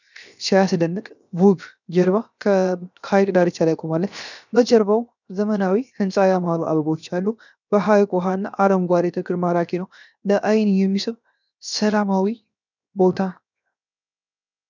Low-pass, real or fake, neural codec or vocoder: 7.2 kHz; fake; codec, 16 kHz, 0.7 kbps, FocalCodec